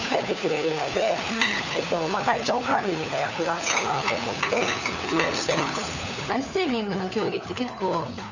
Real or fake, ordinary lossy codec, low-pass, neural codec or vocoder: fake; none; 7.2 kHz; codec, 16 kHz, 4 kbps, FunCodec, trained on LibriTTS, 50 frames a second